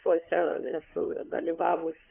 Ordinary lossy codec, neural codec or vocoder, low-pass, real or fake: AAC, 24 kbps; codec, 16 kHz, 2 kbps, FunCodec, trained on LibriTTS, 25 frames a second; 3.6 kHz; fake